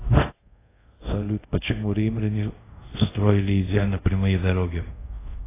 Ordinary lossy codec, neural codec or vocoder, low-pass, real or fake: AAC, 16 kbps; codec, 24 kHz, 0.5 kbps, DualCodec; 3.6 kHz; fake